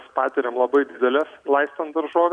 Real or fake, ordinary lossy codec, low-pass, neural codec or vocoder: real; MP3, 64 kbps; 9.9 kHz; none